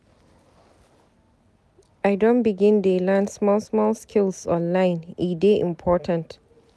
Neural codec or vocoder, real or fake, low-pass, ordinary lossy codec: none; real; none; none